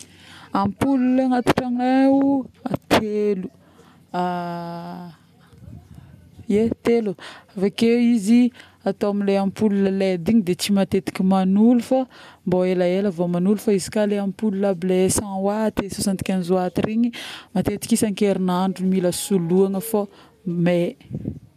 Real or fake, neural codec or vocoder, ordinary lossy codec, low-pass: real; none; none; 14.4 kHz